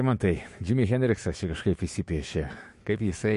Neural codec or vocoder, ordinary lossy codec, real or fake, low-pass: autoencoder, 48 kHz, 128 numbers a frame, DAC-VAE, trained on Japanese speech; MP3, 48 kbps; fake; 14.4 kHz